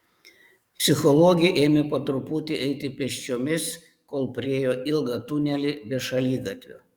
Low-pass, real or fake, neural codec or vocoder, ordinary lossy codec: 19.8 kHz; fake; codec, 44.1 kHz, 7.8 kbps, DAC; Opus, 64 kbps